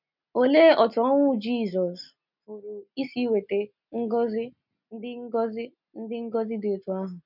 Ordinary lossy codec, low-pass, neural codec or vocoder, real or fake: none; 5.4 kHz; none; real